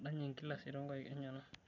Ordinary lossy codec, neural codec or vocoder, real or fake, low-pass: none; none; real; 7.2 kHz